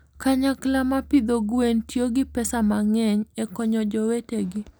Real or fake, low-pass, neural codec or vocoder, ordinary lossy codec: real; none; none; none